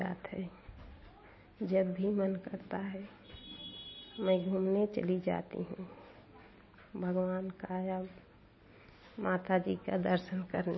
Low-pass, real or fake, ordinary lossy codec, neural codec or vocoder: 7.2 kHz; real; MP3, 24 kbps; none